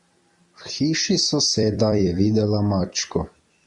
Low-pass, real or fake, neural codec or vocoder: 10.8 kHz; fake; vocoder, 44.1 kHz, 128 mel bands every 256 samples, BigVGAN v2